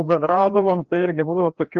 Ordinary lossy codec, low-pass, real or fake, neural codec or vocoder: Opus, 16 kbps; 7.2 kHz; fake; codec, 16 kHz, 2 kbps, FreqCodec, larger model